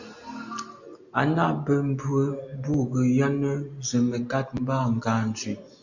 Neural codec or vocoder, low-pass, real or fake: none; 7.2 kHz; real